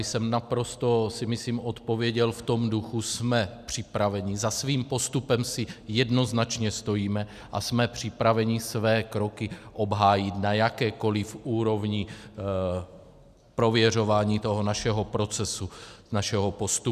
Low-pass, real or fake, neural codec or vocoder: 14.4 kHz; real; none